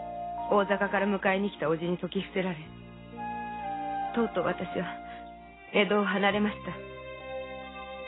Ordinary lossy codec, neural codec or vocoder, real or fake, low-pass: AAC, 16 kbps; none; real; 7.2 kHz